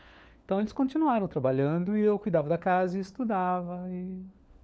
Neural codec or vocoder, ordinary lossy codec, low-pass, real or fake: codec, 16 kHz, 4 kbps, FunCodec, trained on LibriTTS, 50 frames a second; none; none; fake